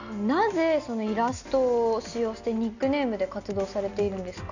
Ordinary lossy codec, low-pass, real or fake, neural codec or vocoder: none; 7.2 kHz; real; none